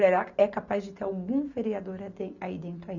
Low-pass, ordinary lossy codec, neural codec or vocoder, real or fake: 7.2 kHz; none; none; real